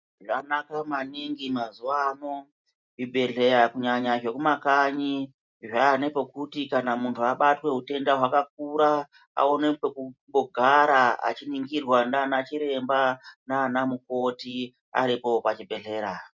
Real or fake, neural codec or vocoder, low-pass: real; none; 7.2 kHz